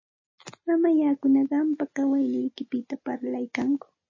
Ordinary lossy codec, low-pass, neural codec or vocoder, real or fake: MP3, 32 kbps; 7.2 kHz; none; real